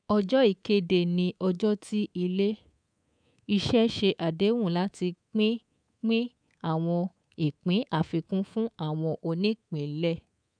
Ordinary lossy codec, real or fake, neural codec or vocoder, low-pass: none; fake; codec, 24 kHz, 3.1 kbps, DualCodec; 9.9 kHz